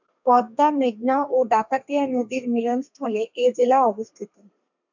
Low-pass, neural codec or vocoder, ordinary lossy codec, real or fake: 7.2 kHz; codec, 32 kHz, 1.9 kbps, SNAC; MP3, 64 kbps; fake